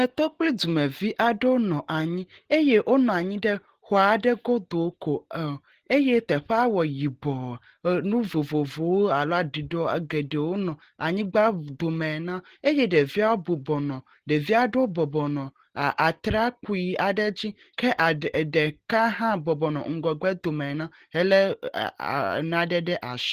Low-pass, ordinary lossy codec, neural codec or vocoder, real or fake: 14.4 kHz; Opus, 16 kbps; none; real